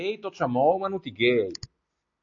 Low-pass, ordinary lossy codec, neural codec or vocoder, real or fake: 7.2 kHz; AAC, 48 kbps; none; real